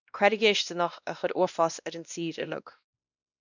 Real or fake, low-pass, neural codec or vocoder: fake; 7.2 kHz; codec, 16 kHz, 1 kbps, X-Codec, WavLM features, trained on Multilingual LibriSpeech